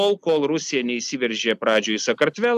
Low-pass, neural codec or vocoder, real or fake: 14.4 kHz; none; real